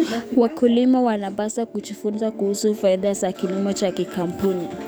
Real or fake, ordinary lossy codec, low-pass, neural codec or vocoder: fake; none; none; codec, 44.1 kHz, 7.8 kbps, DAC